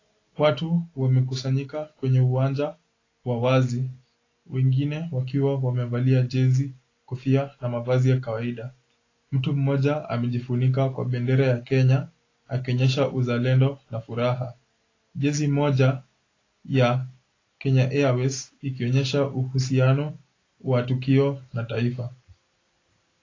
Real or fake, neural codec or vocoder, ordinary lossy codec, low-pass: real; none; AAC, 32 kbps; 7.2 kHz